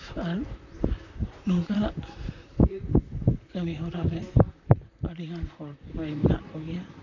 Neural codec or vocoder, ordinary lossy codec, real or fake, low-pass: vocoder, 44.1 kHz, 128 mel bands, Pupu-Vocoder; none; fake; 7.2 kHz